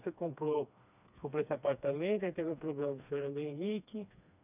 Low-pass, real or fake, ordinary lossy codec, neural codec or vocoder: 3.6 kHz; fake; none; codec, 16 kHz, 2 kbps, FreqCodec, smaller model